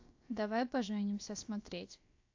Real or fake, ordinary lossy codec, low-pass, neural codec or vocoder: fake; AAC, 48 kbps; 7.2 kHz; codec, 16 kHz, about 1 kbps, DyCAST, with the encoder's durations